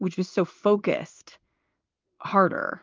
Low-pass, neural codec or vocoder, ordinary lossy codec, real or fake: 7.2 kHz; codec, 16 kHz in and 24 kHz out, 1 kbps, XY-Tokenizer; Opus, 24 kbps; fake